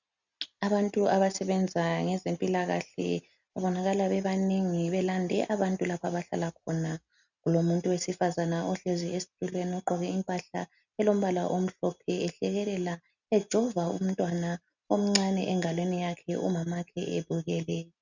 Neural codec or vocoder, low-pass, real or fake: none; 7.2 kHz; real